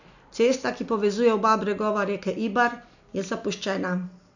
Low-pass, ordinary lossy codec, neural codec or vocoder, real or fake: 7.2 kHz; none; none; real